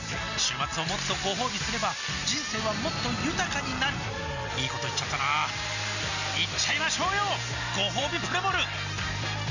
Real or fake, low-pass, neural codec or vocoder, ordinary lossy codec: real; 7.2 kHz; none; none